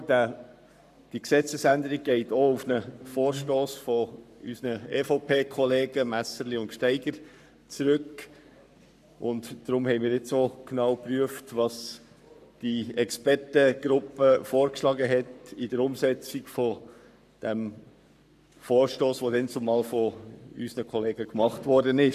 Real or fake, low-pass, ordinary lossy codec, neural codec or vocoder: fake; 14.4 kHz; none; codec, 44.1 kHz, 7.8 kbps, Pupu-Codec